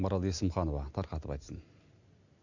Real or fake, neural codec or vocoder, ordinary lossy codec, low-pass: real; none; none; 7.2 kHz